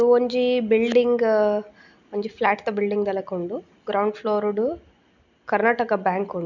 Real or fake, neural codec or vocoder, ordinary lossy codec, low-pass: real; none; none; 7.2 kHz